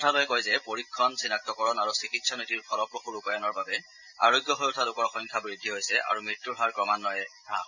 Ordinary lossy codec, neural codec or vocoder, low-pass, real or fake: none; none; 7.2 kHz; real